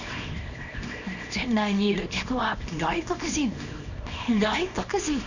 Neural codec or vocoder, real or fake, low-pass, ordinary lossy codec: codec, 24 kHz, 0.9 kbps, WavTokenizer, small release; fake; 7.2 kHz; none